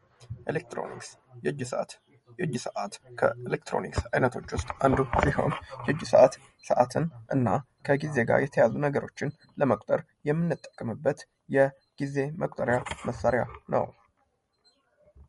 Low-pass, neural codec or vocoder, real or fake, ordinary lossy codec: 19.8 kHz; none; real; MP3, 48 kbps